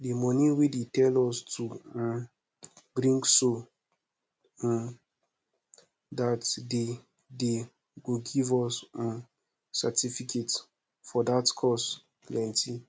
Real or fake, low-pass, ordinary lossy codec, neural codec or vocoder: real; none; none; none